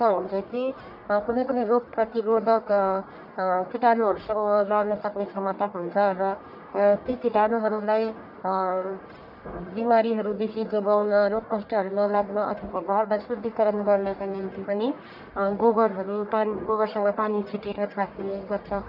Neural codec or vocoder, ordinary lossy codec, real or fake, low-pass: codec, 44.1 kHz, 1.7 kbps, Pupu-Codec; none; fake; 5.4 kHz